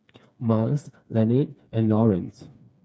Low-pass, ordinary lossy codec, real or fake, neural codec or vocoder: none; none; fake; codec, 16 kHz, 4 kbps, FreqCodec, smaller model